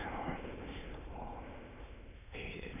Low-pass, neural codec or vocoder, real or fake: 3.6 kHz; autoencoder, 22.05 kHz, a latent of 192 numbers a frame, VITS, trained on many speakers; fake